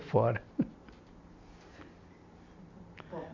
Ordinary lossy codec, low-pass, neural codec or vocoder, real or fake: MP3, 48 kbps; 7.2 kHz; none; real